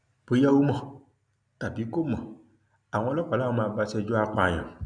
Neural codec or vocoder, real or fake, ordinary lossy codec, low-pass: none; real; none; 9.9 kHz